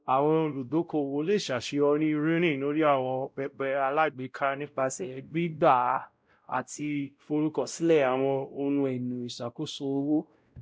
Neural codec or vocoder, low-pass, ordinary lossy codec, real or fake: codec, 16 kHz, 0.5 kbps, X-Codec, WavLM features, trained on Multilingual LibriSpeech; none; none; fake